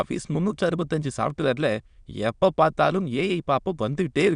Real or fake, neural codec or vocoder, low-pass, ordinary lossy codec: fake; autoencoder, 22.05 kHz, a latent of 192 numbers a frame, VITS, trained on many speakers; 9.9 kHz; none